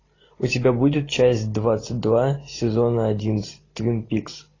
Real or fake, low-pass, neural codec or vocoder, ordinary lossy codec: real; 7.2 kHz; none; AAC, 32 kbps